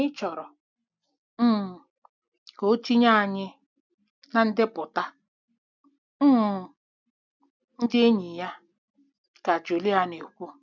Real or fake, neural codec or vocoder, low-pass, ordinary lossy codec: real; none; 7.2 kHz; none